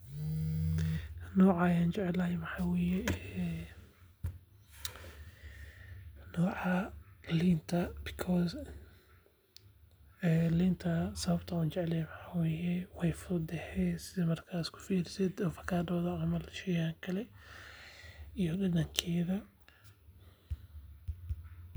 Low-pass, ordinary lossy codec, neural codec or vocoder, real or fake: none; none; none; real